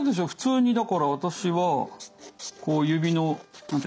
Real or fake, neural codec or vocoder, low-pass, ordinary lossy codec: real; none; none; none